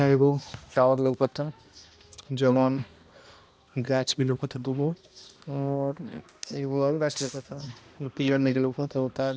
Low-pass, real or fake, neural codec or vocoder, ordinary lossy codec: none; fake; codec, 16 kHz, 1 kbps, X-Codec, HuBERT features, trained on balanced general audio; none